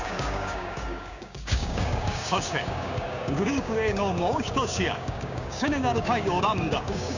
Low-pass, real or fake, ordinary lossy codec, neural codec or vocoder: 7.2 kHz; fake; none; codec, 16 kHz, 6 kbps, DAC